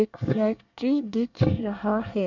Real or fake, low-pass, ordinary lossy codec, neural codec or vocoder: fake; 7.2 kHz; none; codec, 24 kHz, 1 kbps, SNAC